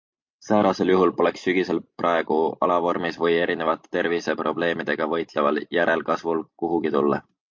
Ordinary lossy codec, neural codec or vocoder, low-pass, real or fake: MP3, 48 kbps; none; 7.2 kHz; real